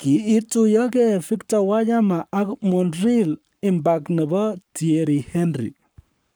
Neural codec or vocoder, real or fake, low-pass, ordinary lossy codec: codec, 44.1 kHz, 7.8 kbps, Pupu-Codec; fake; none; none